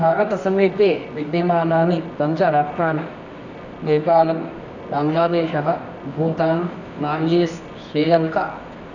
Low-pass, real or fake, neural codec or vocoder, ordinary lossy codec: 7.2 kHz; fake; codec, 24 kHz, 0.9 kbps, WavTokenizer, medium music audio release; none